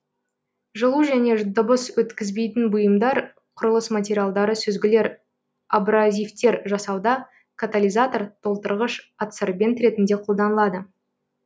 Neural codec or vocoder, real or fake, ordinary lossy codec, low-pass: none; real; none; none